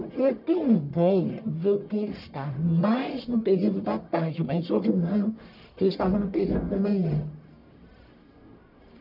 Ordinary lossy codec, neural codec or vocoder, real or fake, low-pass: none; codec, 44.1 kHz, 1.7 kbps, Pupu-Codec; fake; 5.4 kHz